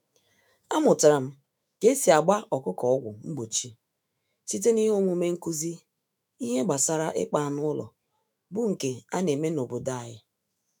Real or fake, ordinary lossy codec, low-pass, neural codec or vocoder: fake; none; none; autoencoder, 48 kHz, 128 numbers a frame, DAC-VAE, trained on Japanese speech